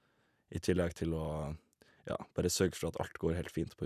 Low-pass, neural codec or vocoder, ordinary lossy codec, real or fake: 14.4 kHz; none; none; real